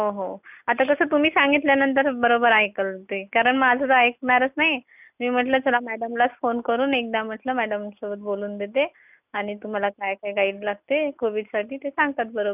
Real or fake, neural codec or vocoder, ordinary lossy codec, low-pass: real; none; none; 3.6 kHz